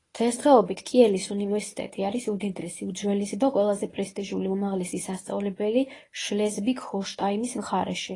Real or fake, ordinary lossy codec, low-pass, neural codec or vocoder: fake; AAC, 32 kbps; 10.8 kHz; codec, 24 kHz, 0.9 kbps, WavTokenizer, medium speech release version 1